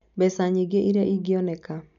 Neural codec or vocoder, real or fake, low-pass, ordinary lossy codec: none; real; 7.2 kHz; none